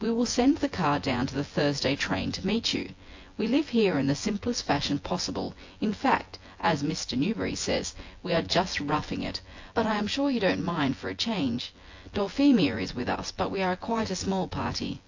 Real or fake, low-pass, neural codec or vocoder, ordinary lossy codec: fake; 7.2 kHz; vocoder, 24 kHz, 100 mel bands, Vocos; AAC, 48 kbps